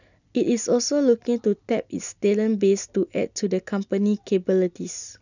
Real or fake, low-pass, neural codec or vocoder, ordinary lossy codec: real; 7.2 kHz; none; none